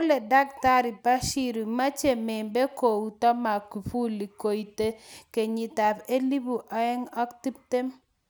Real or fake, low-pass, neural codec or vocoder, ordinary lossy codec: real; none; none; none